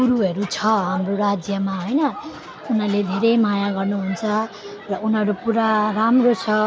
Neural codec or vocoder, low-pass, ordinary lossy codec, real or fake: none; none; none; real